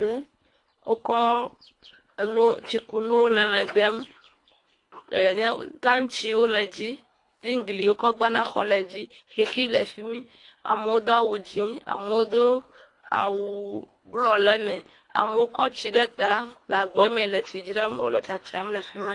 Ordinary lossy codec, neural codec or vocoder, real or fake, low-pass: AAC, 48 kbps; codec, 24 kHz, 1.5 kbps, HILCodec; fake; 10.8 kHz